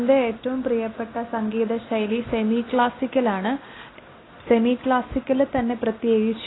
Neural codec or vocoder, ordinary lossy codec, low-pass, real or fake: none; AAC, 16 kbps; 7.2 kHz; real